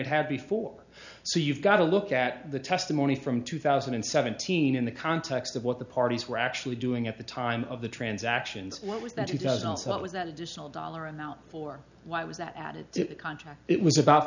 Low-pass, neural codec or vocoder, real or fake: 7.2 kHz; none; real